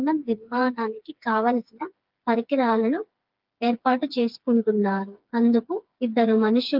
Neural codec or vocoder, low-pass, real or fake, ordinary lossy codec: codec, 16 kHz, 4 kbps, FreqCodec, smaller model; 5.4 kHz; fake; Opus, 32 kbps